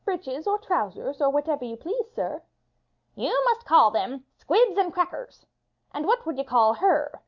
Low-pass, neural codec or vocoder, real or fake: 7.2 kHz; none; real